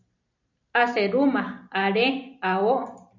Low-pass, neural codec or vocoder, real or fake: 7.2 kHz; none; real